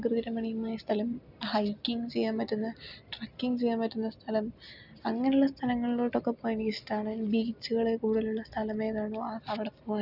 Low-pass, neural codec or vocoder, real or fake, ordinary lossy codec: 5.4 kHz; none; real; none